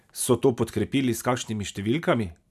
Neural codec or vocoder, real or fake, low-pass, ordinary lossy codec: none; real; 14.4 kHz; none